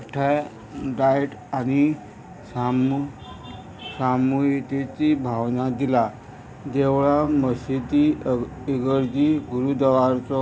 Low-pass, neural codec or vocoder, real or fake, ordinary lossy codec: none; none; real; none